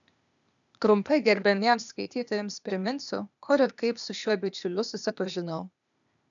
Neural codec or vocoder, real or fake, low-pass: codec, 16 kHz, 0.8 kbps, ZipCodec; fake; 7.2 kHz